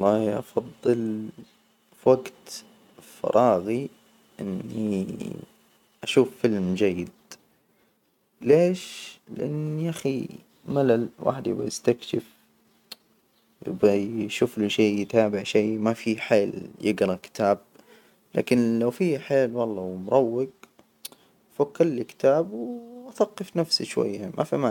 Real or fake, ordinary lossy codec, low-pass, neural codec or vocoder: real; none; 19.8 kHz; none